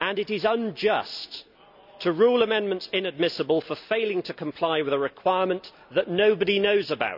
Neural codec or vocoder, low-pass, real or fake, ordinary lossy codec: none; 5.4 kHz; real; none